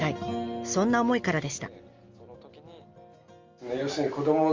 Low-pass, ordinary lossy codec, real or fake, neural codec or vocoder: 7.2 kHz; Opus, 32 kbps; real; none